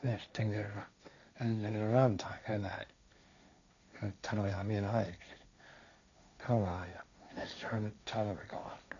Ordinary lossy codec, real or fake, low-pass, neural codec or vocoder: none; fake; 7.2 kHz; codec, 16 kHz, 1.1 kbps, Voila-Tokenizer